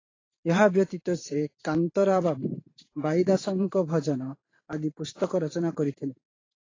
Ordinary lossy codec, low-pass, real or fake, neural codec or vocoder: AAC, 32 kbps; 7.2 kHz; fake; vocoder, 44.1 kHz, 128 mel bands every 512 samples, BigVGAN v2